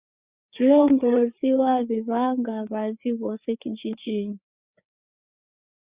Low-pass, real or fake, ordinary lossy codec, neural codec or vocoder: 3.6 kHz; fake; Opus, 64 kbps; codec, 16 kHz, 4 kbps, FreqCodec, larger model